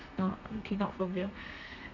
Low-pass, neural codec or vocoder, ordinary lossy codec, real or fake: 7.2 kHz; codec, 32 kHz, 1.9 kbps, SNAC; none; fake